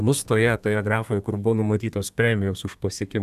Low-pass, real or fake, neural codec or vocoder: 14.4 kHz; fake; codec, 32 kHz, 1.9 kbps, SNAC